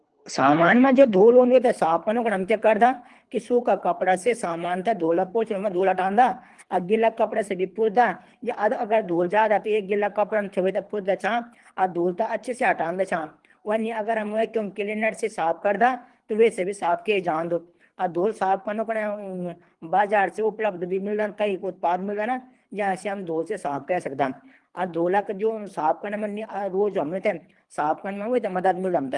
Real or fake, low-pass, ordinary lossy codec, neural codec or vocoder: fake; 10.8 kHz; Opus, 24 kbps; codec, 24 kHz, 3 kbps, HILCodec